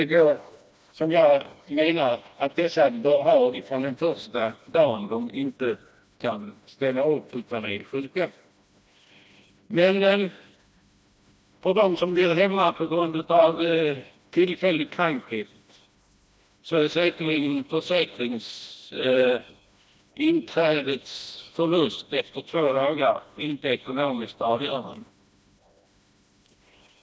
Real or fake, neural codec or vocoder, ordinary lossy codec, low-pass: fake; codec, 16 kHz, 1 kbps, FreqCodec, smaller model; none; none